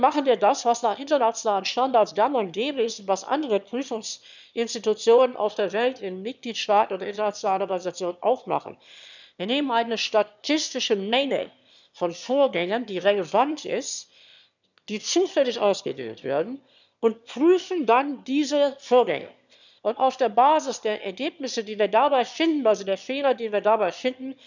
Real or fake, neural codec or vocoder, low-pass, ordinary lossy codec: fake; autoencoder, 22.05 kHz, a latent of 192 numbers a frame, VITS, trained on one speaker; 7.2 kHz; none